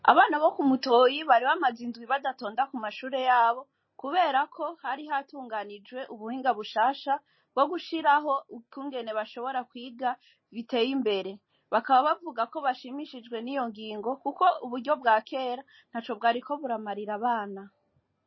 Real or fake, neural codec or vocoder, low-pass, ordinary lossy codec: real; none; 7.2 kHz; MP3, 24 kbps